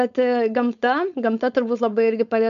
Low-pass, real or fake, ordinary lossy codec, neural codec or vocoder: 7.2 kHz; fake; MP3, 64 kbps; codec, 16 kHz, 4.8 kbps, FACodec